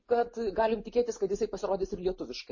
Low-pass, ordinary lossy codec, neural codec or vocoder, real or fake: 7.2 kHz; MP3, 32 kbps; none; real